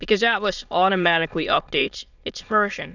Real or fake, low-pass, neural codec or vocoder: fake; 7.2 kHz; autoencoder, 22.05 kHz, a latent of 192 numbers a frame, VITS, trained on many speakers